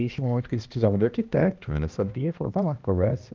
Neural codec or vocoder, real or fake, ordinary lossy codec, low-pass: codec, 16 kHz, 1 kbps, X-Codec, HuBERT features, trained on balanced general audio; fake; Opus, 24 kbps; 7.2 kHz